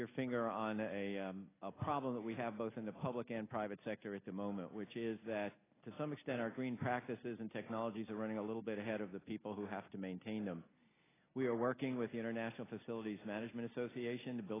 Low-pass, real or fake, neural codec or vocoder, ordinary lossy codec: 3.6 kHz; real; none; AAC, 16 kbps